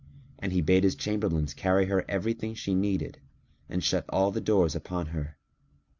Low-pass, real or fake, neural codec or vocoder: 7.2 kHz; real; none